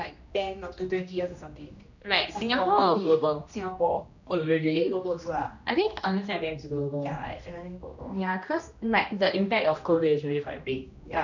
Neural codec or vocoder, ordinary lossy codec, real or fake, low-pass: codec, 16 kHz, 1 kbps, X-Codec, HuBERT features, trained on general audio; none; fake; 7.2 kHz